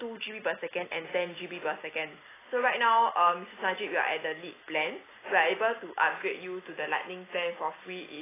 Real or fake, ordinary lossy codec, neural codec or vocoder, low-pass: real; AAC, 16 kbps; none; 3.6 kHz